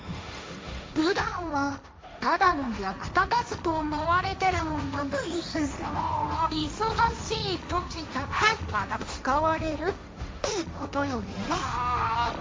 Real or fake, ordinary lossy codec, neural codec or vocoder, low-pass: fake; none; codec, 16 kHz, 1.1 kbps, Voila-Tokenizer; none